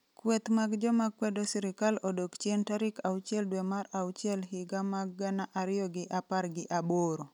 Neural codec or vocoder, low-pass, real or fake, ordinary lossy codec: none; 19.8 kHz; real; none